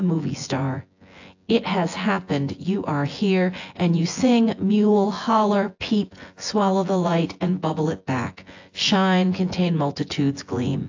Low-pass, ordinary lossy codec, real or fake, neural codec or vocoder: 7.2 kHz; AAC, 48 kbps; fake; vocoder, 24 kHz, 100 mel bands, Vocos